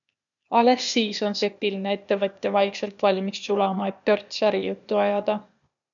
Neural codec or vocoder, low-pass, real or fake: codec, 16 kHz, 0.8 kbps, ZipCodec; 7.2 kHz; fake